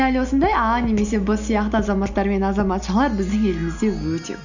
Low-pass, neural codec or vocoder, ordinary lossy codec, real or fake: 7.2 kHz; none; none; real